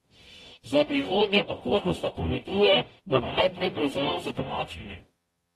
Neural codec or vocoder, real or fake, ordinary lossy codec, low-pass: codec, 44.1 kHz, 0.9 kbps, DAC; fake; AAC, 32 kbps; 19.8 kHz